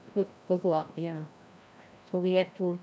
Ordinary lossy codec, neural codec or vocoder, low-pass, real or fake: none; codec, 16 kHz, 1 kbps, FreqCodec, larger model; none; fake